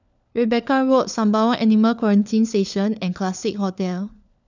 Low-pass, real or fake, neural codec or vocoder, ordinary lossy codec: 7.2 kHz; fake; codec, 16 kHz, 4 kbps, FunCodec, trained on LibriTTS, 50 frames a second; none